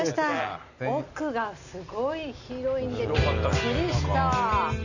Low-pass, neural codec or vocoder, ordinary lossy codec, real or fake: 7.2 kHz; none; none; real